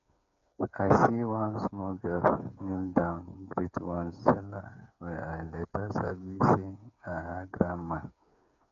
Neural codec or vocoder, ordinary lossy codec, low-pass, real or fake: codec, 16 kHz, 8 kbps, FreqCodec, smaller model; AAC, 96 kbps; 7.2 kHz; fake